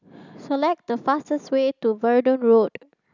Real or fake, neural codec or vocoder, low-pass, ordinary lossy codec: real; none; 7.2 kHz; none